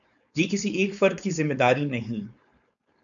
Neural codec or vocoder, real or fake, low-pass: codec, 16 kHz, 4.8 kbps, FACodec; fake; 7.2 kHz